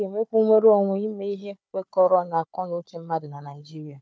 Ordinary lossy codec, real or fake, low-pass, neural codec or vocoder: none; fake; none; codec, 16 kHz, 4 kbps, FunCodec, trained on Chinese and English, 50 frames a second